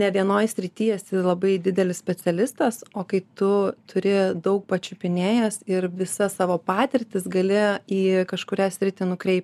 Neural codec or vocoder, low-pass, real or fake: none; 14.4 kHz; real